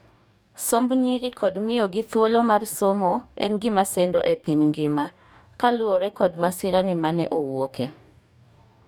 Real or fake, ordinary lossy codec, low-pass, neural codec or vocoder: fake; none; none; codec, 44.1 kHz, 2.6 kbps, DAC